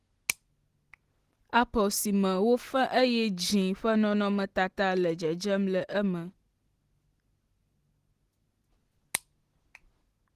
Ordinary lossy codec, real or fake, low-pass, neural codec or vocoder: Opus, 16 kbps; real; 14.4 kHz; none